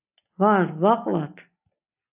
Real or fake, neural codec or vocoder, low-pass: real; none; 3.6 kHz